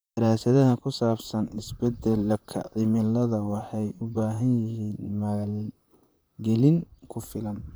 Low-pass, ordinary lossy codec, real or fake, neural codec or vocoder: none; none; fake; vocoder, 44.1 kHz, 128 mel bands, Pupu-Vocoder